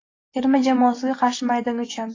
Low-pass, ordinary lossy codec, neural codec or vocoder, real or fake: 7.2 kHz; AAC, 32 kbps; none; real